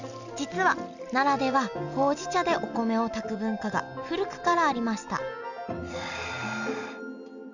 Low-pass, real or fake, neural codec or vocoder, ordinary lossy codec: 7.2 kHz; real; none; none